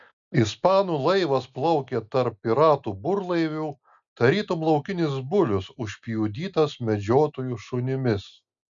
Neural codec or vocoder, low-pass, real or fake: none; 7.2 kHz; real